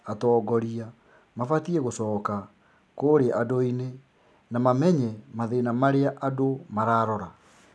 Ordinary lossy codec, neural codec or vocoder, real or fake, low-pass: none; none; real; none